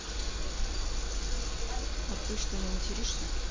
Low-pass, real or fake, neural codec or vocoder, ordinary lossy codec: 7.2 kHz; real; none; MP3, 48 kbps